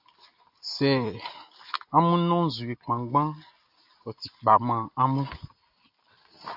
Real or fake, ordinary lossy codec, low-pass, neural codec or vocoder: real; AAC, 48 kbps; 5.4 kHz; none